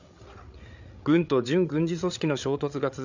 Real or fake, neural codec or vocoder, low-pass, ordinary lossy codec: fake; codec, 16 kHz, 8 kbps, FreqCodec, larger model; 7.2 kHz; none